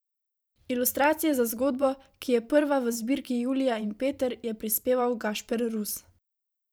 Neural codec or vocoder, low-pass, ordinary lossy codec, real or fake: vocoder, 44.1 kHz, 128 mel bands every 512 samples, BigVGAN v2; none; none; fake